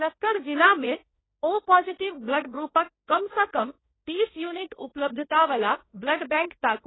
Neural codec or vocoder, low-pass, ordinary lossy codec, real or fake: codec, 16 kHz, 1.1 kbps, Voila-Tokenizer; 7.2 kHz; AAC, 16 kbps; fake